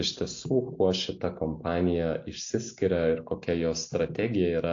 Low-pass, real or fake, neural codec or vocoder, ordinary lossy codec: 7.2 kHz; real; none; AAC, 48 kbps